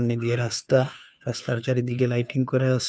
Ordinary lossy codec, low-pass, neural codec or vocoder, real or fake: none; none; codec, 16 kHz, 2 kbps, FunCodec, trained on Chinese and English, 25 frames a second; fake